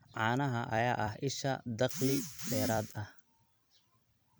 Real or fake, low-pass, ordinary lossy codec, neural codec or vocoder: fake; none; none; vocoder, 44.1 kHz, 128 mel bands every 256 samples, BigVGAN v2